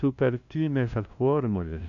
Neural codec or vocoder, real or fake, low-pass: codec, 16 kHz, 0.5 kbps, FunCodec, trained on LibriTTS, 25 frames a second; fake; 7.2 kHz